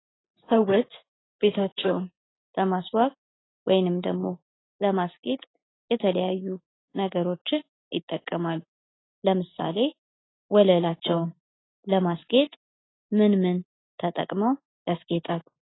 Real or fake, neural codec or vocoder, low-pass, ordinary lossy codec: real; none; 7.2 kHz; AAC, 16 kbps